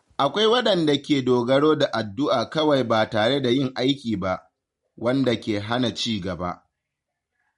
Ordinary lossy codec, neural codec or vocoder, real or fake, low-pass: MP3, 48 kbps; none; real; 14.4 kHz